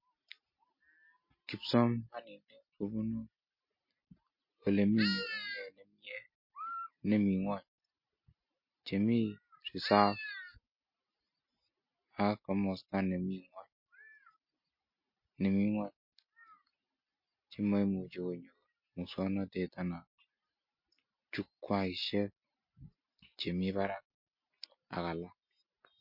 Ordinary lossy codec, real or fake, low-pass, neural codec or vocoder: MP3, 32 kbps; real; 5.4 kHz; none